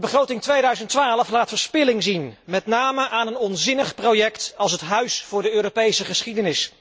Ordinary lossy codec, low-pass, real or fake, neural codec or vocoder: none; none; real; none